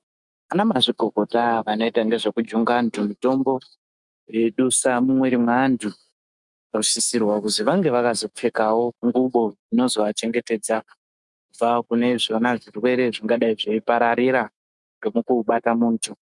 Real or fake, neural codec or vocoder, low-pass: fake; autoencoder, 48 kHz, 128 numbers a frame, DAC-VAE, trained on Japanese speech; 10.8 kHz